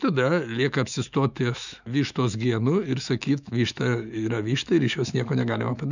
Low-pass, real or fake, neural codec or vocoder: 7.2 kHz; fake; autoencoder, 48 kHz, 128 numbers a frame, DAC-VAE, trained on Japanese speech